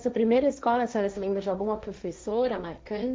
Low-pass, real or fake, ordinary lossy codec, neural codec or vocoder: none; fake; none; codec, 16 kHz, 1.1 kbps, Voila-Tokenizer